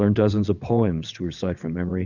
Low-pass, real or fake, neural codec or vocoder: 7.2 kHz; fake; vocoder, 22.05 kHz, 80 mel bands, WaveNeXt